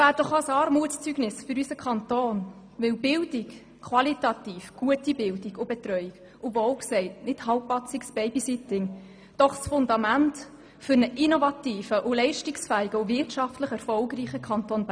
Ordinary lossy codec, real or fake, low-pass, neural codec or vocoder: none; real; none; none